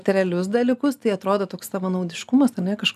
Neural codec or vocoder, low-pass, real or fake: none; 14.4 kHz; real